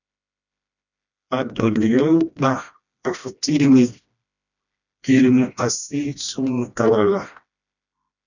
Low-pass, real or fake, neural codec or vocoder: 7.2 kHz; fake; codec, 16 kHz, 1 kbps, FreqCodec, smaller model